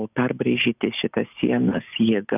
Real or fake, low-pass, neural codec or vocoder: real; 3.6 kHz; none